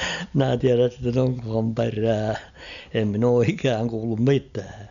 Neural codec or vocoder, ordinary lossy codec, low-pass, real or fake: none; none; 7.2 kHz; real